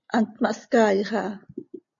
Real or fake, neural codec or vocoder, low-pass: real; none; 7.2 kHz